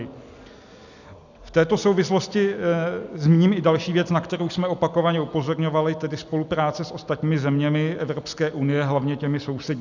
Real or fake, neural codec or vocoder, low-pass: real; none; 7.2 kHz